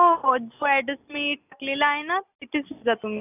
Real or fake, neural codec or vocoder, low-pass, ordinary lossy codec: real; none; 3.6 kHz; none